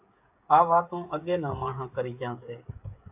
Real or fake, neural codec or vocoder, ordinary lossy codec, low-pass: fake; codec, 16 kHz, 16 kbps, FreqCodec, smaller model; AAC, 32 kbps; 3.6 kHz